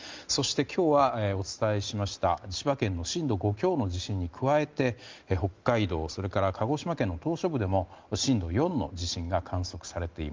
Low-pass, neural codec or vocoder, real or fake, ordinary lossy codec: 7.2 kHz; none; real; Opus, 32 kbps